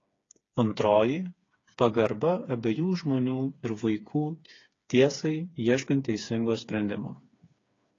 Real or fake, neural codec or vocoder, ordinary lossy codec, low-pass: fake; codec, 16 kHz, 4 kbps, FreqCodec, smaller model; AAC, 32 kbps; 7.2 kHz